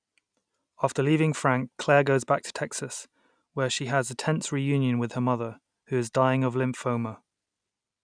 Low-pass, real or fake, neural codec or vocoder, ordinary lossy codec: 9.9 kHz; real; none; none